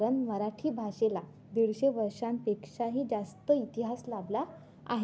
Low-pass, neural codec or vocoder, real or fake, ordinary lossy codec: none; none; real; none